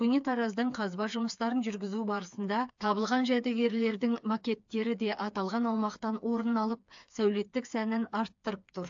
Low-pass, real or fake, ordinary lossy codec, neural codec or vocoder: 7.2 kHz; fake; AAC, 64 kbps; codec, 16 kHz, 4 kbps, FreqCodec, smaller model